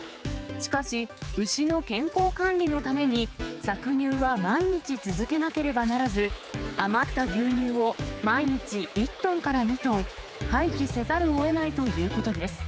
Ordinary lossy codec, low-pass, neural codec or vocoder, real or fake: none; none; codec, 16 kHz, 4 kbps, X-Codec, HuBERT features, trained on general audio; fake